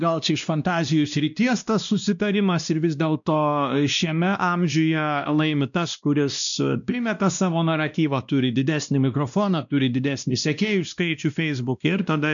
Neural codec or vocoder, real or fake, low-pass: codec, 16 kHz, 1 kbps, X-Codec, WavLM features, trained on Multilingual LibriSpeech; fake; 7.2 kHz